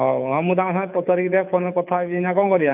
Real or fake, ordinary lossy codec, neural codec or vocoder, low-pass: fake; none; codec, 24 kHz, 6 kbps, HILCodec; 3.6 kHz